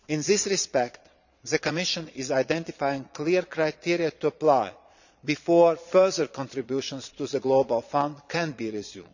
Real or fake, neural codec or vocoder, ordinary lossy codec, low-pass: fake; vocoder, 22.05 kHz, 80 mel bands, Vocos; none; 7.2 kHz